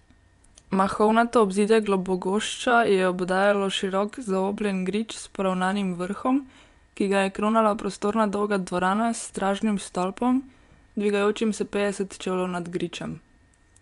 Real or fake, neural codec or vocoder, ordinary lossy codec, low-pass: fake; vocoder, 24 kHz, 100 mel bands, Vocos; none; 10.8 kHz